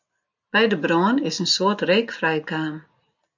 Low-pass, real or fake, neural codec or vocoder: 7.2 kHz; real; none